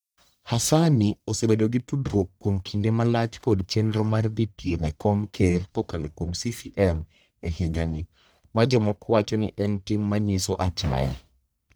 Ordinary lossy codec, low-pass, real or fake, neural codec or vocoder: none; none; fake; codec, 44.1 kHz, 1.7 kbps, Pupu-Codec